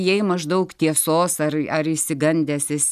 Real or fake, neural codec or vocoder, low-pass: real; none; 14.4 kHz